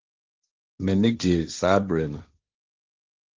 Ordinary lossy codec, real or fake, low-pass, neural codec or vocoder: Opus, 24 kbps; fake; 7.2 kHz; codec, 16 kHz, 1.1 kbps, Voila-Tokenizer